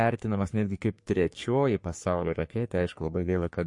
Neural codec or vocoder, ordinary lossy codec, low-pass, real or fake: codec, 44.1 kHz, 3.4 kbps, Pupu-Codec; MP3, 48 kbps; 10.8 kHz; fake